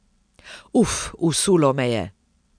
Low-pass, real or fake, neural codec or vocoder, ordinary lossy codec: 9.9 kHz; real; none; none